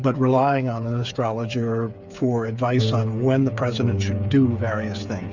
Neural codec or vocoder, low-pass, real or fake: codec, 16 kHz, 8 kbps, FreqCodec, smaller model; 7.2 kHz; fake